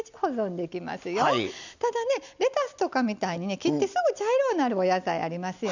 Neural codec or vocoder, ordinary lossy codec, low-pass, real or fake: none; none; 7.2 kHz; real